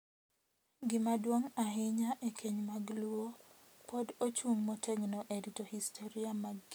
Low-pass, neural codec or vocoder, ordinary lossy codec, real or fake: none; none; none; real